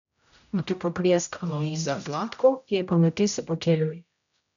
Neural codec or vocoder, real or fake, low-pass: codec, 16 kHz, 0.5 kbps, X-Codec, HuBERT features, trained on general audio; fake; 7.2 kHz